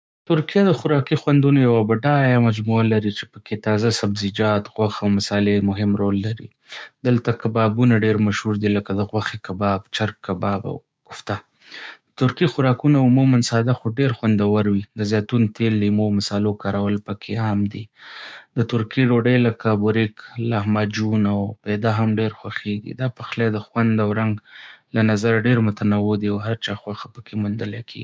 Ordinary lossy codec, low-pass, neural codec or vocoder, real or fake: none; none; codec, 16 kHz, 6 kbps, DAC; fake